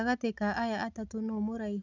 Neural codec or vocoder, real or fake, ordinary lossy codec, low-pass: none; real; none; 7.2 kHz